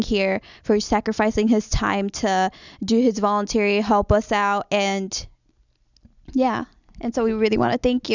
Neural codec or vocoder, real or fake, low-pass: none; real; 7.2 kHz